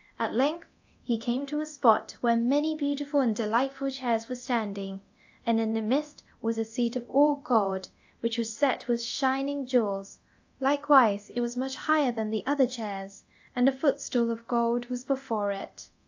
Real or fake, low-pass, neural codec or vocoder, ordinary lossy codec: fake; 7.2 kHz; codec, 24 kHz, 0.9 kbps, DualCodec; AAC, 48 kbps